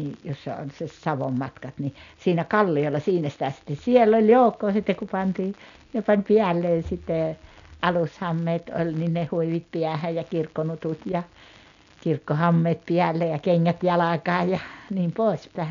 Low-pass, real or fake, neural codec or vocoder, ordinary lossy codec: 7.2 kHz; real; none; none